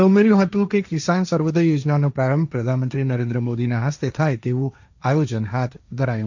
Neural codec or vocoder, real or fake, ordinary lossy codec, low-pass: codec, 16 kHz, 1.1 kbps, Voila-Tokenizer; fake; none; 7.2 kHz